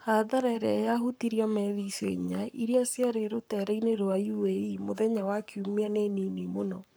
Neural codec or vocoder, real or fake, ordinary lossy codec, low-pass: codec, 44.1 kHz, 7.8 kbps, Pupu-Codec; fake; none; none